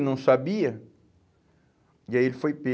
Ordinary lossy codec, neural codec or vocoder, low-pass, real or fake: none; none; none; real